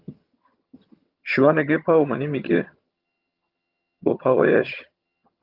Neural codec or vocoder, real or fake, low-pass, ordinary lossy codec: vocoder, 22.05 kHz, 80 mel bands, HiFi-GAN; fake; 5.4 kHz; Opus, 32 kbps